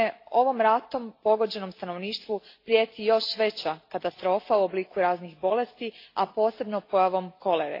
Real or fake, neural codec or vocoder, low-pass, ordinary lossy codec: real; none; 5.4 kHz; AAC, 32 kbps